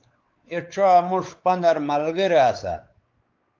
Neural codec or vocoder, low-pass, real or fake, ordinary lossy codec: codec, 16 kHz, 4 kbps, X-Codec, WavLM features, trained on Multilingual LibriSpeech; 7.2 kHz; fake; Opus, 32 kbps